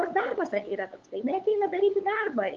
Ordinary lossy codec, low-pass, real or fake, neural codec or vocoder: Opus, 32 kbps; 7.2 kHz; fake; codec, 16 kHz, 8 kbps, FunCodec, trained on LibriTTS, 25 frames a second